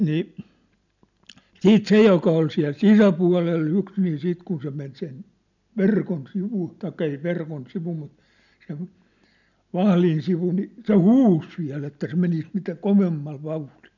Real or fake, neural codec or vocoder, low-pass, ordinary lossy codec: real; none; 7.2 kHz; none